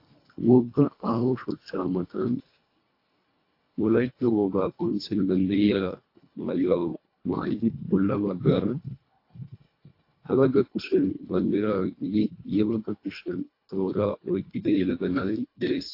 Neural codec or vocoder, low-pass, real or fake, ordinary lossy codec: codec, 24 kHz, 1.5 kbps, HILCodec; 5.4 kHz; fake; AAC, 32 kbps